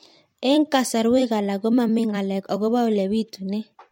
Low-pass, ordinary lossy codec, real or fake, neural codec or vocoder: 19.8 kHz; MP3, 64 kbps; fake; vocoder, 44.1 kHz, 128 mel bands every 256 samples, BigVGAN v2